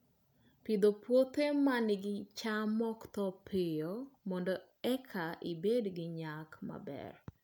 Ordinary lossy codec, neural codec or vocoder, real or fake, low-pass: none; none; real; none